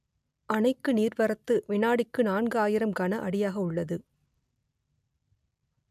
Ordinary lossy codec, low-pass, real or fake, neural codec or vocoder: none; 14.4 kHz; real; none